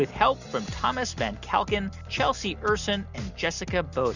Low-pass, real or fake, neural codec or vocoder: 7.2 kHz; real; none